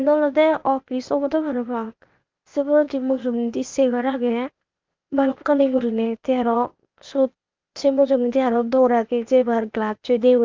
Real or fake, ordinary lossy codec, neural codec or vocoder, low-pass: fake; Opus, 24 kbps; codec, 16 kHz, 0.8 kbps, ZipCodec; 7.2 kHz